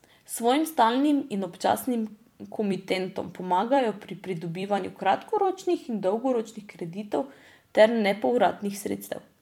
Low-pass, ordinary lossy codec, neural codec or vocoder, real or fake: 19.8 kHz; MP3, 96 kbps; vocoder, 44.1 kHz, 128 mel bands every 256 samples, BigVGAN v2; fake